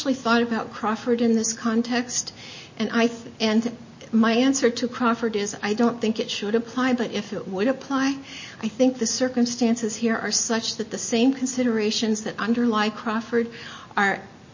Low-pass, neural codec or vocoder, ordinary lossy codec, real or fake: 7.2 kHz; none; MP3, 32 kbps; real